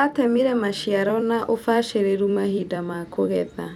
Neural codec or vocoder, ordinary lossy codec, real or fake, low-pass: vocoder, 44.1 kHz, 128 mel bands every 256 samples, BigVGAN v2; none; fake; 19.8 kHz